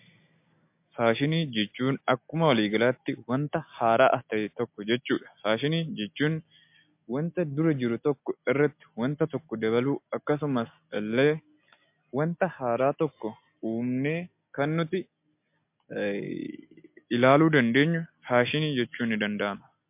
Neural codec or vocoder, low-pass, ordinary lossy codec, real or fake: none; 3.6 kHz; MP3, 32 kbps; real